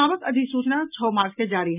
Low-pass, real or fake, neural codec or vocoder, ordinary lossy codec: 3.6 kHz; real; none; none